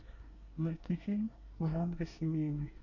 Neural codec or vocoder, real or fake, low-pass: codec, 24 kHz, 1 kbps, SNAC; fake; 7.2 kHz